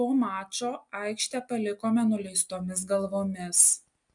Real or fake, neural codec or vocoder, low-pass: real; none; 10.8 kHz